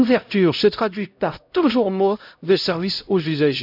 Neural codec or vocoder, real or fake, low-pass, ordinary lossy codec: codec, 16 kHz, 0.5 kbps, X-Codec, HuBERT features, trained on LibriSpeech; fake; 5.4 kHz; none